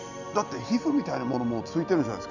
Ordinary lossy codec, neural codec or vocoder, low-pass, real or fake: none; none; 7.2 kHz; real